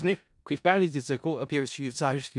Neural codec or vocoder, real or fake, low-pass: codec, 16 kHz in and 24 kHz out, 0.4 kbps, LongCat-Audio-Codec, four codebook decoder; fake; 10.8 kHz